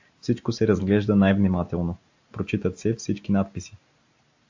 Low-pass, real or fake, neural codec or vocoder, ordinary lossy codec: 7.2 kHz; real; none; AAC, 48 kbps